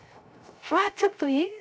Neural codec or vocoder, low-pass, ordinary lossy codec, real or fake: codec, 16 kHz, 0.3 kbps, FocalCodec; none; none; fake